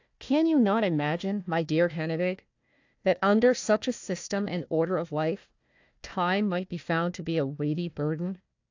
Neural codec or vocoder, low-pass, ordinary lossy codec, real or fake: codec, 16 kHz, 1 kbps, FunCodec, trained on Chinese and English, 50 frames a second; 7.2 kHz; AAC, 48 kbps; fake